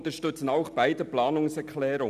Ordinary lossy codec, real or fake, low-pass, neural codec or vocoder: none; real; 14.4 kHz; none